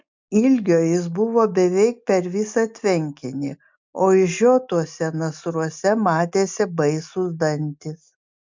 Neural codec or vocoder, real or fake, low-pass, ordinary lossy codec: none; real; 7.2 kHz; MP3, 64 kbps